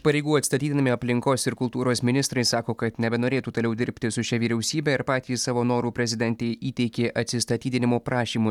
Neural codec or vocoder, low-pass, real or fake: none; 19.8 kHz; real